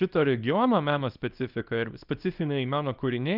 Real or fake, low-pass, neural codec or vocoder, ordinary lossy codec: fake; 5.4 kHz; codec, 24 kHz, 0.9 kbps, WavTokenizer, small release; Opus, 24 kbps